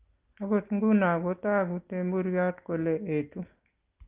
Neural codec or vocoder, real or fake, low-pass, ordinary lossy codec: none; real; 3.6 kHz; Opus, 24 kbps